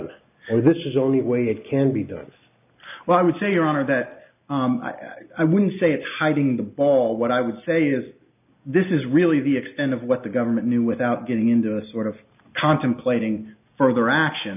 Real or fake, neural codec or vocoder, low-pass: real; none; 3.6 kHz